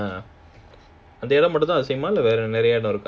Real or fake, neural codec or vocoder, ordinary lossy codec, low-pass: real; none; none; none